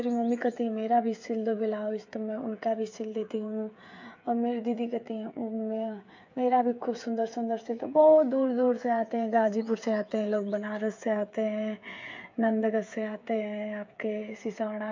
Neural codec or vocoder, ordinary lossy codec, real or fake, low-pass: codec, 16 kHz, 8 kbps, FreqCodec, smaller model; MP3, 48 kbps; fake; 7.2 kHz